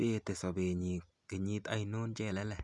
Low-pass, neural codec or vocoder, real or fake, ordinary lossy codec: 10.8 kHz; none; real; none